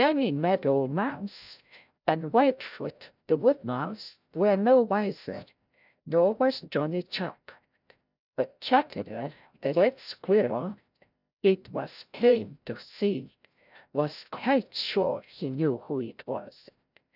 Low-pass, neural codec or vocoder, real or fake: 5.4 kHz; codec, 16 kHz, 0.5 kbps, FreqCodec, larger model; fake